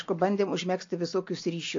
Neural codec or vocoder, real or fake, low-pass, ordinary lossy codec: none; real; 7.2 kHz; AAC, 48 kbps